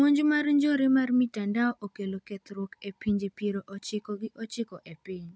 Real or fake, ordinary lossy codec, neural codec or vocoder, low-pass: real; none; none; none